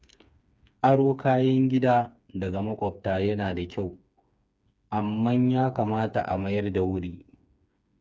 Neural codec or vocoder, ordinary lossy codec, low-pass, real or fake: codec, 16 kHz, 4 kbps, FreqCodec, smaller model; none; none; fake